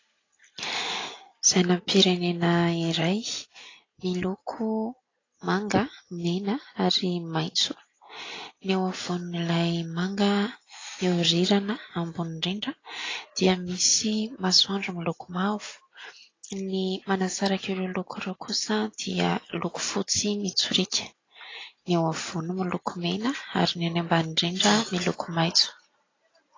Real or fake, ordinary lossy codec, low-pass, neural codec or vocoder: real; AAC, 32 kbps; 7.2 kHz; none